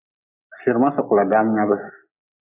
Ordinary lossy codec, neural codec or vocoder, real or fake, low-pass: AAC, 32 kbps; none; real; 3.6 kHz